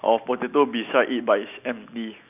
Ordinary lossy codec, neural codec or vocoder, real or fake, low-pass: none; none; real; 3.6 kHz